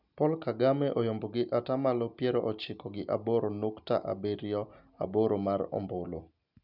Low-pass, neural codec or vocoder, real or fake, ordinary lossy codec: 5.4 kHz; none; real; none